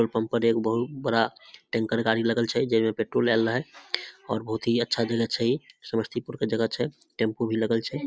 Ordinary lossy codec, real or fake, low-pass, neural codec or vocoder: none; real; none; none